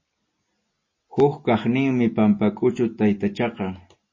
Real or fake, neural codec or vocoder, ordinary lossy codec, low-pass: real; none; MP3, 32 kbps; 7.2 kHz